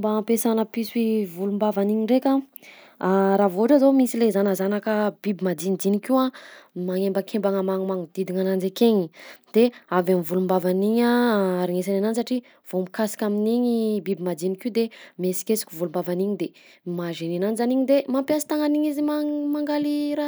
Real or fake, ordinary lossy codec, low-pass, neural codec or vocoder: real; none; none; none